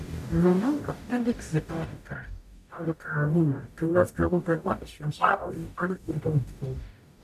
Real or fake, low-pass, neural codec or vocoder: fake; 14.4 kHz; codec, 44.1 kHz, 0.9 kbps, DAC